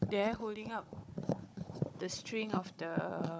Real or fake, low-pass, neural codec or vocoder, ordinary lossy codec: fake; none; codec, 16 kHz, 16 kbps, FunCodec, trained on Chinese and English, 50 frames a second; none